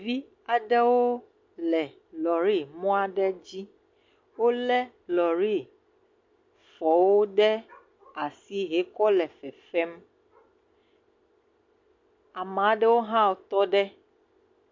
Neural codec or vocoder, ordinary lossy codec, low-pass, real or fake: none; MP3, 48 kbps; 7.2 kHz; real